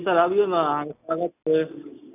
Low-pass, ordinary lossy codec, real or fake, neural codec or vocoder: 3.6 kHz; none; real; none